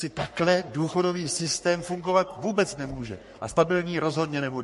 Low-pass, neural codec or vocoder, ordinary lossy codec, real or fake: 14.4 kHz; codec, 44.1 kHz, 3.4 kbps, Pupu-Codec; MP3, 48 kbps; fake